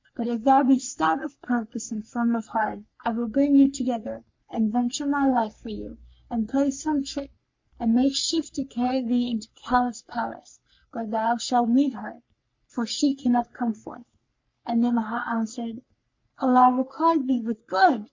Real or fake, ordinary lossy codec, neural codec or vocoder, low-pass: fake; MP3, 48 kbps; codec, 44.1 kHz, 3.4 kbps, Pupu-Codec; 7.2 kHz